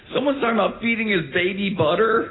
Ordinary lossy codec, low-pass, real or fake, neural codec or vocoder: AAC, 16 kbps; 7.2 kHz; real; none